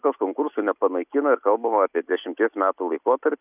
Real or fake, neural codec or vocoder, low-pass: real; none; 3.6 kHz